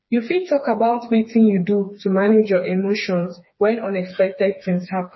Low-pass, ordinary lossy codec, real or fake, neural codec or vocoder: 7.2 kHz; MP3, 24 kbps; fake; codec, 16 kHz, 4 kbps, FreqCodec, smaller model